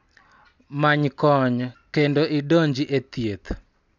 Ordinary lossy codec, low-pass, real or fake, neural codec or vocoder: none; 7.2 kHz; real; none